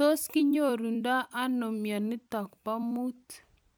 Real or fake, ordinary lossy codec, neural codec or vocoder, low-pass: fake; none; vocoder, 44.1 kHz, 128 mel bands every 256 samples, BigVGAN v2; none